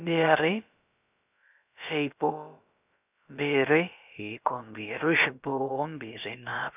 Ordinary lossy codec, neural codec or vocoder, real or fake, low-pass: none; codec, 16 kHz, about 1 kbps, DyCAST, with the encoder's durations; fake; 3.6 kHz